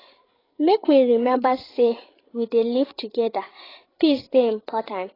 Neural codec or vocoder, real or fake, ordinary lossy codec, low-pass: codec, 16 kHz, 8 kbps, FreqCodec, larger model; fake; AAC, 24 kbps; 5.4 kHz